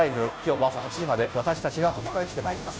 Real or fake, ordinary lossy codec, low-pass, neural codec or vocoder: fake; none; none; codec, 16 kHz, 0.5 kbps, FunCodec, trained on Chinese and English, 25 frames a second